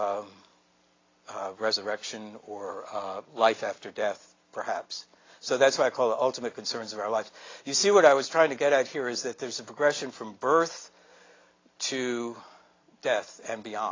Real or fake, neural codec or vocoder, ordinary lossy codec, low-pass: real; none; AAC, 32 kbps; 7.2 kHz